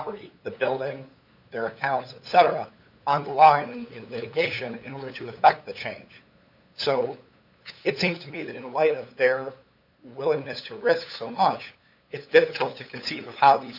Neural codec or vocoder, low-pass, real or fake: codec, 16 kHz, 8 kbps, FunCodec, trained on LibriTTS, 25 frames a second; 5.4 kHz; fake